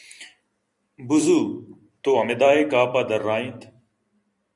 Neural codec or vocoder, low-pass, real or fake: vocoder, 44.1 kHz, 128 mel bands every 256 samples, BigVGAN v2; 10.8 kHz; fake